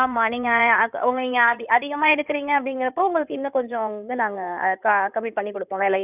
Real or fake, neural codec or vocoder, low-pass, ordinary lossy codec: fake; codec, 16 kHz in and 24 kHz out, 2.2 kbps, FireRedTTS-2 codec; 3.6 kHz; none